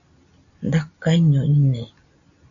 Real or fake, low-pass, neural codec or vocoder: real; 7.2 kHz; none